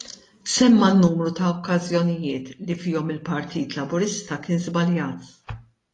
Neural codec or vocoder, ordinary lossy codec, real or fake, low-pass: none; AAC, 32 kbps; real; 10.8 kHz